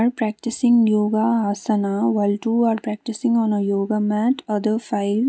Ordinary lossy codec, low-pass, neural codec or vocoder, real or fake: none; none; none; real